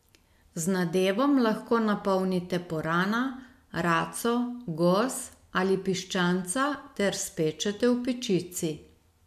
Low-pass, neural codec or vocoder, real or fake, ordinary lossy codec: 14.4 kHz; none; real; MP3, 96 kbps